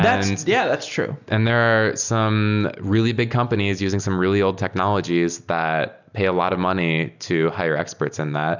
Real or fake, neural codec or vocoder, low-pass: real; none; 7.2 kHz